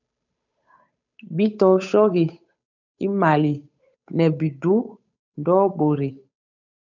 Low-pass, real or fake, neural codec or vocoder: 7.2 kHz; fake; codec, 16 kHz, 8 kbps, FunCodec, trained on Chinese and English, 25 frames a second